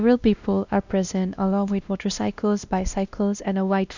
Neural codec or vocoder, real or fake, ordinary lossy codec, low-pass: codec, 16 kHz, 1 kbps, X-Codec, WavLM features, trained on Multilingual LibriSpeech; fake; none; 7.2 kHz